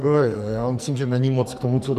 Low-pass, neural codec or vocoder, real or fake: 14.4 kHz; codec, 44.1 kHz, 2.6 kbps, SNAC; fake